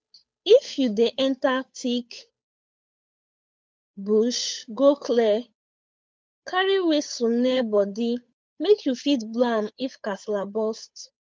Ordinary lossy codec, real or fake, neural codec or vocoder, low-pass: none; fake; codec, 16 kHz, 8 kbps, FunCodec, trained on Chinese and English, 25 frames a second; none